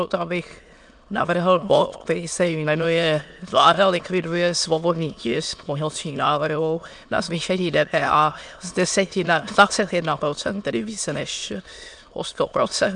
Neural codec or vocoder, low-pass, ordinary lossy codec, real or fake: autoencoder, 22.05 kHz, a latent of 192 numbers a frame, VITS, trained on many speakers; 9.9 kHz; MP3, 64 kbps; fake